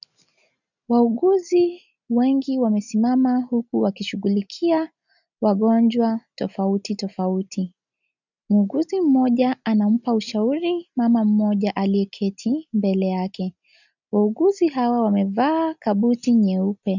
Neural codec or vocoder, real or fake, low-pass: none; real; 7.2 kHz